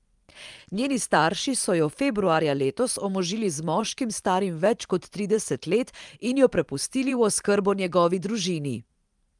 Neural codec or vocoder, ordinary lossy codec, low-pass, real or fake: vocoder, 44.1 kHz, 128 mel bands every 512 samples, BigVGAN v2; Opus, 32 kbps; 10.8 kHz; fake